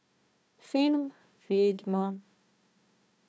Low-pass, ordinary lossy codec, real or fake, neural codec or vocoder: none; none; fake; codec, 16 kHz, 1 kbps, FunCodec, trained on Chinese and English, 50 frames a second